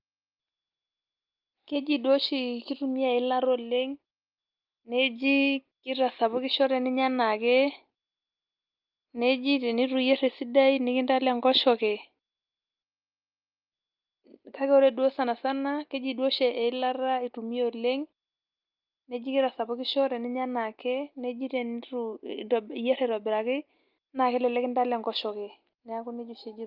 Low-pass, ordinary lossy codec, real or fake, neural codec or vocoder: 5.4 kHz; Opus, 24 kbps; real; none